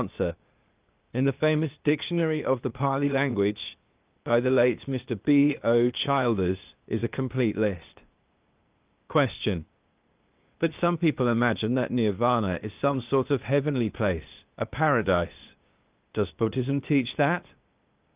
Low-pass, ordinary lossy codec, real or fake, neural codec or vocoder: 3.6 kHz; Opus, 24 kbps; fake; codec, 16 kHz, 0.8 kbps, ZipCodec